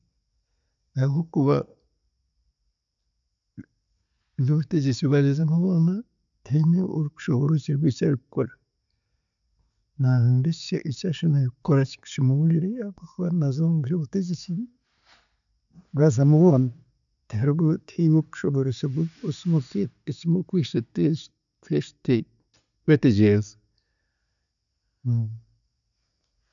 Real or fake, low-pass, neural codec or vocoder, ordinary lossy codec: real; 7.2 kHz; none; none